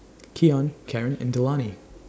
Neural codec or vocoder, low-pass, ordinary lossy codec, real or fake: none; none; none; real